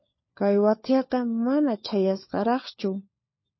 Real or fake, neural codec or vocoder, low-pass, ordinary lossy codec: fake; codec, 16 kHz, 4 kbps, FunCodec, trained on LibriTTS, 50 frames a second; 7.2 kHz; MP3, 24 kbps